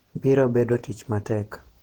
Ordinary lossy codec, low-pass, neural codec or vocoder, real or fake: Opus, 16 kbps; 19.8 kHz; vocoder, 48 kHz, 128 mel bands, Vocos; fake